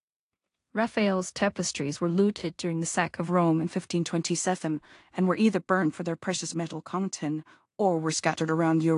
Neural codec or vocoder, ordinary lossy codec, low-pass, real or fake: codec, 16 kHz in and 24 kHz out, 0.4 kbps, LongCat-Audio-Codec, two codebook decoder; AAC, 48 kbps; 10.8 kHz; fake